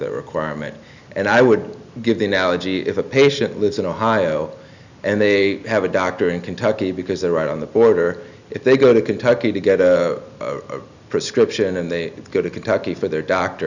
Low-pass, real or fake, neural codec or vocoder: 7.2 kHz; real; none